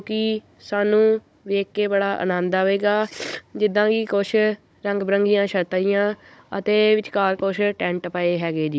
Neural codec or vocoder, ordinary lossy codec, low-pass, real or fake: codec, 16 kHz, 16 kbps, FunCodec, trained on Chinese and English, 50 frames a second; none; none; fake